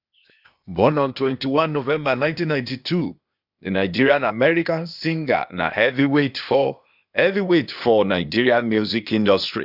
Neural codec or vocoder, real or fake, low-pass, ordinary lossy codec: codec, 16 kHz, 0.8 kbps, ZipCodec; fake; 5.4 kHz; none